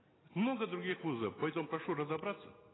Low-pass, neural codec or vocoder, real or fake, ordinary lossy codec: 7.2 kHz; none; real; AAC, 16 kbps